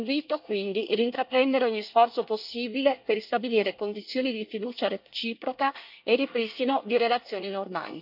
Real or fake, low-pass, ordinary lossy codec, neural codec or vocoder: fake; 5.4 kHz; none; codec, 24 kHz, 1 kbps, SNAC